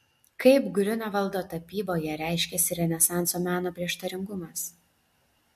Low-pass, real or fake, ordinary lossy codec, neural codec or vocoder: 14.4 kHz; real; MP3, 64 kbps; none